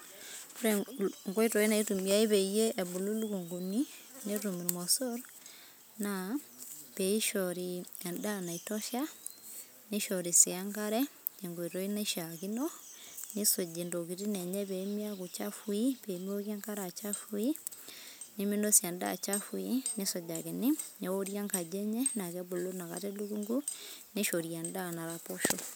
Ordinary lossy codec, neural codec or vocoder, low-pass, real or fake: none; none; none; real